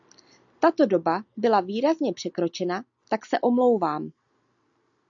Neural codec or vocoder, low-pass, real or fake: none; 7.2 kHz; real